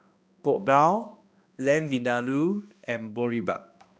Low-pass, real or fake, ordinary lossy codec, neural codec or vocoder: none; fake; none; codec, 16 kHz, 1 kbps, X-Codec, HuBERT features, trained on balanced general audio